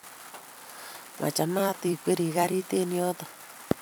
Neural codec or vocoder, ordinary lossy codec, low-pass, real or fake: vocoder, 44.1 kHz, 128 mel bands every 256 samples, BigVGAN v2; none; none; fake